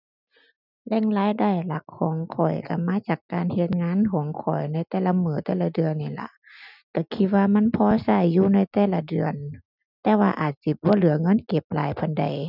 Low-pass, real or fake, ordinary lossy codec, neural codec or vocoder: 5.4 kHz; real; none; none